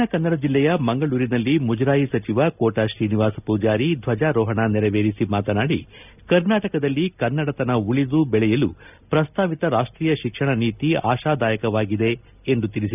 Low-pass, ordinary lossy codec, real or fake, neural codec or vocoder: 3.6 kHz; none; real; none